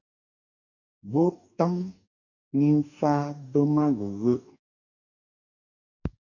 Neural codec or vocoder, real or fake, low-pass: codec, 44.1 kHz, 2.6 kbps, DAC; fake; 7.2 kHz